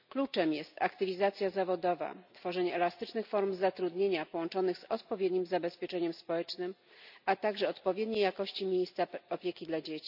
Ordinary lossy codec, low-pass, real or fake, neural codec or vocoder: none; 5.4 kHz; real; none